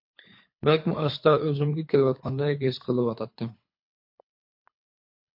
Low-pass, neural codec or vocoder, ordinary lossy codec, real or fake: 5.4 kHz; codec, 24 kHz, 3 kbps, HILCodec; MP3, 32 kbps; fake